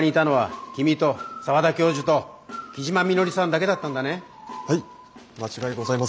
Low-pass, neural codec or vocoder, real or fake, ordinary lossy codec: none; none; real; none